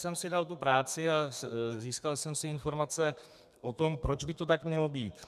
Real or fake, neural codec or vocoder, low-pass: fake; codec, 32 kHz, 1.9 kbps, SNAC; 14.4 kHz